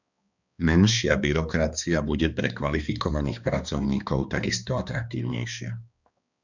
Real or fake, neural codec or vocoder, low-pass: fake; codec, 16 kHz, 2 kbps, X-Codec, HuBERT features, trained on balanced general audio; 7.2 kHz